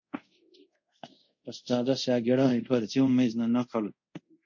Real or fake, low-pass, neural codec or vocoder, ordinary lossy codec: fake; 7.2 kHz; codec, 24 kHz, 0.5 kbps, DualCodec; MP3, 48 kbps